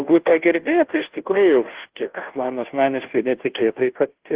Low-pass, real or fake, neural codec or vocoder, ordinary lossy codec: 3.6 kHz; fake; codec, 16 kHz, 0.5 kbps, FunCodec, trained on Chinese and English, 25 frames a second; Opus, 16 kbps